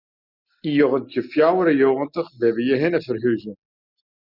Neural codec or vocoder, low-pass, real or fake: none; 5.4 kHz; real